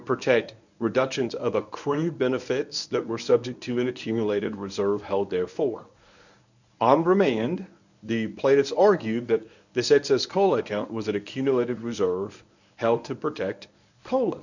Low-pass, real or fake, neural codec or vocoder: 7.2 kHz; fake; codec, 24 kHz, 0.9 kbps, WavTokenizer, medium speech release version 1